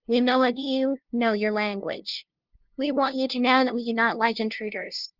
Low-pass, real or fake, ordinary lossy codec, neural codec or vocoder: 5.4 kHz; fake; Opus, 32 kbps; codec, 16 kHz, 0.5 kbps, FunCodec, trained on LibriTTS, 25 frames a second